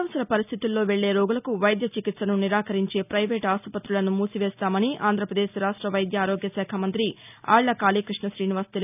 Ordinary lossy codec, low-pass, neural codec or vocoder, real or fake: none; 3.6 kHz; none; real